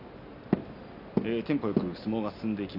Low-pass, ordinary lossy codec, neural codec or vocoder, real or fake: 5.4 kHz; none; none; real